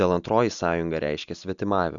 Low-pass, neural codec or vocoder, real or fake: 7.2 kHz; none; real